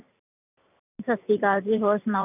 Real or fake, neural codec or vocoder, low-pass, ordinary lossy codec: fake; vocoder, 44.1 kHz, 128 mel bands every 512 samples, BigVGAN v2; 3.6 kHz; none